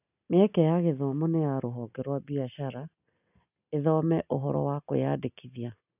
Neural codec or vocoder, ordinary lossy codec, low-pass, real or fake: none; none; 3.6 kHz; real